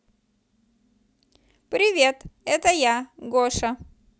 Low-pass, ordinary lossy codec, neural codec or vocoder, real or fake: none; none; none; real